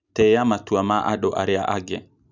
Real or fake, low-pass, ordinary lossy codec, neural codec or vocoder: real; 7.2 kHz; none; none